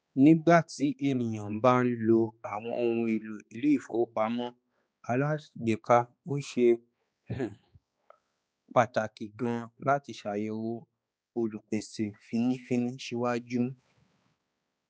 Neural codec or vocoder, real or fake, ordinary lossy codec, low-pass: codec, 16 kHz, 2 kbps, X-Codec, HuBERT features, trained on balanced general audio; fake; none; none